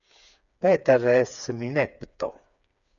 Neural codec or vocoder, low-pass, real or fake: codec, 16 kHz, 4 kbps, FreqCodec, smaller model; 7.2 kHz; fake